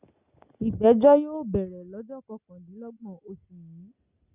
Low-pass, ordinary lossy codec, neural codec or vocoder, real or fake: 3.6 kHz; Opus, 64 kbps; none; real